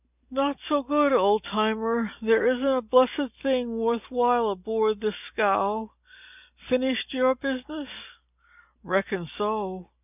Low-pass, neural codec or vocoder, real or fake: 3.6 kHz; none; real